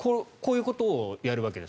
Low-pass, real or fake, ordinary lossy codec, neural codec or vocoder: none; real; none; none